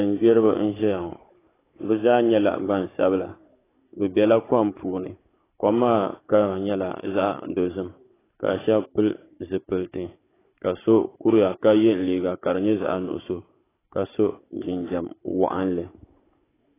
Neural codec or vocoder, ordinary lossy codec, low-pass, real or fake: codec, 16 kHz, 16 kbps, FunCodec, trained on Chinese and English, 50 frames a second; AAC, 16 kbps; 3.6 kHz; fake